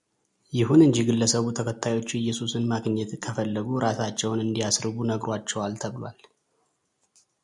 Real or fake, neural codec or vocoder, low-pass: real; none; 10.8 kHz